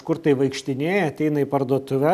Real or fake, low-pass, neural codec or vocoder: real; 14.4 kHz; none